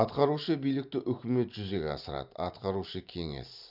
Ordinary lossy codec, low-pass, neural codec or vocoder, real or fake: none; 5.4 kHz; vocoder, 44.1 kHz, 128 mel bands every 512 samples, BigVGAN v2; fake